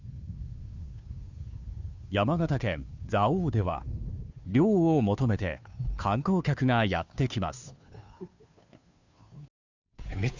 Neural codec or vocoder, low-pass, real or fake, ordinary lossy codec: codec, 16 kHz, 2 kbps, FunCodec, trained on Chinese and English, 25 frames a second; 7.2 kHz; fake; none